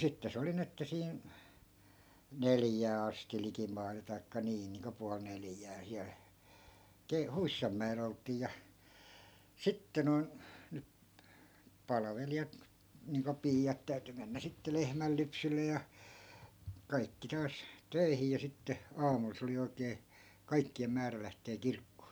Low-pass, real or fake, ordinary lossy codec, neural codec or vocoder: none; real; none; none